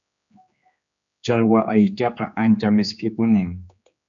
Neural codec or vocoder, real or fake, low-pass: codec, 16 kHz, 2 kbps, X-Codec, HuBERT features, trained on general audio; fake; 7.2 kHz